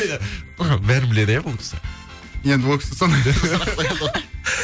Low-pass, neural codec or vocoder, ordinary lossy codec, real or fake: none; none; none; real